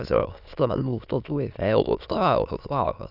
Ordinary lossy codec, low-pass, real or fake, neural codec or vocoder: none; 5.4 kHz; fake; autoencoder, 22.05 kHz, a latent of 192 numbers a frame, VITS, trained on many speakers